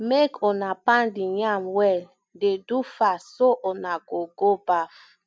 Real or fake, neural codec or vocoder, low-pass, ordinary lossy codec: real; none; none; none